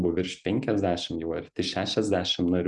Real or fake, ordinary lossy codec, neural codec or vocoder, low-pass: real; Opus, 64 kbps; none; 10.8 kHz